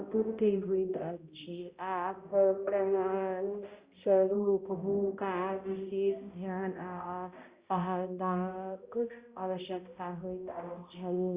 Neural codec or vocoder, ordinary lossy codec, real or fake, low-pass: codec, 16 kHz, 0.5 kbps, X-Codec, HuBERT features, trained on balanced general audio; Opus, 64 kbps; fake; 3.6 kHz